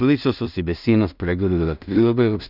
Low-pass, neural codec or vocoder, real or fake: 5.4 kHz; codec, 16 kHz in and 24 kHz out, 0.4 kbps, LongCat-Audio-Codec, two codebook decoder; fake